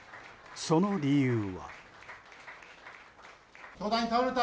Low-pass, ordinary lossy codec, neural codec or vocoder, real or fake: none; none; none; real